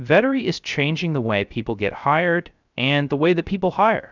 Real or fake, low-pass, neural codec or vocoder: fake; 7.2 kHz; codec, 16 kHz, 0.3 kbps, FocalCodec